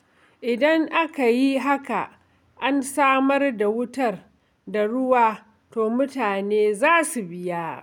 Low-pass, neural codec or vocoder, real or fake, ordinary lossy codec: 19.8 kHz; none; real; none